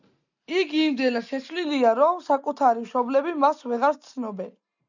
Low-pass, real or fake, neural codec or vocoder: 7.2 kHz; real; none